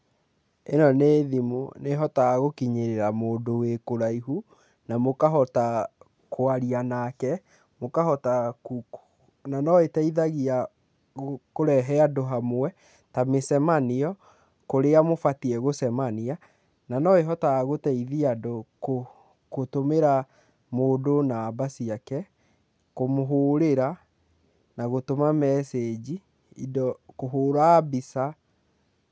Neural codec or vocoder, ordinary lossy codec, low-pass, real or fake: none; none; none; real